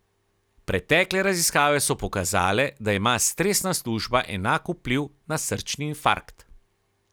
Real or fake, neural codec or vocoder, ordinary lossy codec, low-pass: real; none; none; none